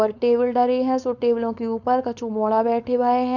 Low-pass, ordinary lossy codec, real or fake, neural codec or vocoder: 7.2 kHz; none; fake; codec, 16 kHz, 4.8 kbps, FACodec